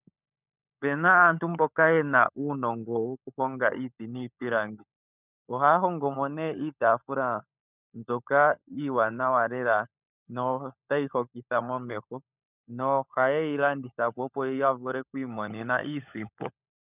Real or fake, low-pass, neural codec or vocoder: fake; 3.6 kHz; codec, 16 kHz, 16 kbps, FunCodec, trained on LibriTTS, 50 frames a second